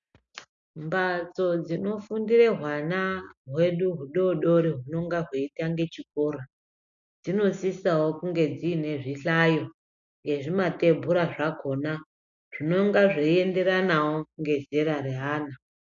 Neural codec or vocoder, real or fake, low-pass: none; real; 7.2 kHz